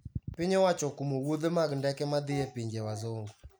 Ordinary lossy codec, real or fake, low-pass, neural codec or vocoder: none; real; none; none